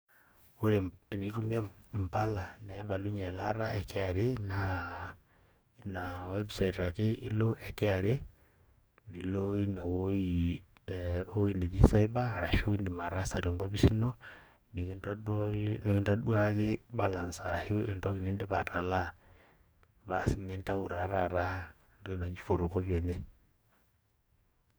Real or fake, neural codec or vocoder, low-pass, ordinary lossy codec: fake; codec, 44.1 kHz, 2.6 kbps, DAC; none; none